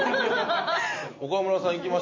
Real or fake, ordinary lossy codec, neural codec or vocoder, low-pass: real; MP3, 32 kbps; none; 7.2 kHz